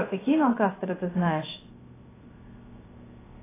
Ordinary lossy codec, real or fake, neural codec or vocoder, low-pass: AAC, 16 kbps; fake; codec, 16 kHz, 0.3 kbps, FocalCodec; 3.6 kHz